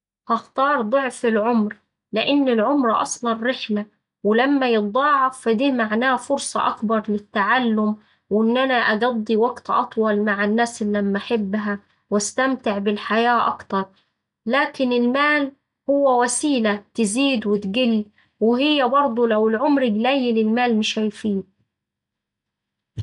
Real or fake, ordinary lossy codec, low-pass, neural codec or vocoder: real; none; 10.8 kHz; none